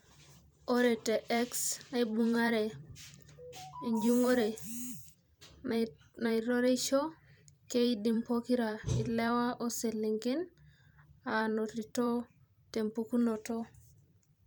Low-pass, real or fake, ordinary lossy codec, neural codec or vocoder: none; fake; none; vocoder, 44.1 kHz, 128 mel bands every 512 samples, BigVGAN v2